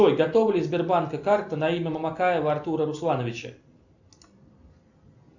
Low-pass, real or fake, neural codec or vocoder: 7.2 kHz; real; none